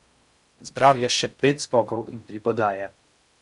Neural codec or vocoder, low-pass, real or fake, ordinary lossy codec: codec, 16 kHz in and 24 kHz out, 0.6 kbps, FocalCodec, streaming, 4096 codes; 10.8 kHz; fake; none